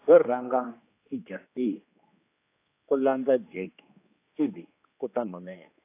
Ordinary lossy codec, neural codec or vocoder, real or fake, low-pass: AAC, 24 kbps; codec, 16 kHz, 2 kbps, X-Codec, HuBERT features, trained on general audio; fake; 3.6 kHz